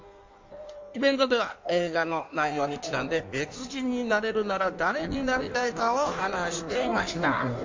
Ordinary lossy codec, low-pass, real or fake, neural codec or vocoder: MP3, 48 kbps; 7.2 kHz; fake; codec, 16 kHz in and 24 kHz out, 1.1 kbps, FireRedTTS-2 codec